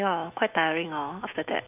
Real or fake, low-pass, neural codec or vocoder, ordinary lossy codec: fake; 3.6 kHz; autoencoder, 48 kHz, 128 numbers a frame, DAC-VAE, trained on Japanese speech; none